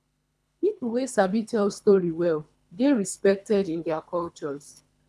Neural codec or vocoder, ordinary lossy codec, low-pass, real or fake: codec, 24 kHz, 3 kbps, HILCodec; none; none; fake